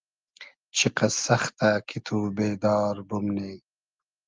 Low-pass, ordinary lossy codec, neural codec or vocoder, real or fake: 7.2 kHz; Opus, 32 kbps; none; real